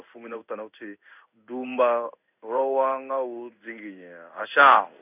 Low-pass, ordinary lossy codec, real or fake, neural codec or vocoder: 3.6 kHz; none; fake; codec, 16 kHz in and 24 kHz out, 1 kbps, XY-Tokenizer